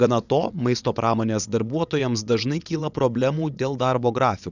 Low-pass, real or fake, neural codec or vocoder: 7.2 kHz; fake; vocoder, 22.05 kHz, 80 mel bands, WaveNeXt